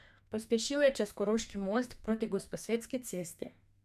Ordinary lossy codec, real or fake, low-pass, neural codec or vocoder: none; fake; 14.4 kHz; codec, 32 kHz, 1.9 kbps, SNAC